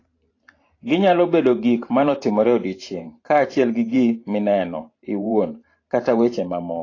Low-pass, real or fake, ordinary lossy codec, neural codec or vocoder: 7.2 kHz; fake; AAC, 32 kbps; vocoder, 44.1 kHz, 128 mel bands every 512 samples, BigVGAN v2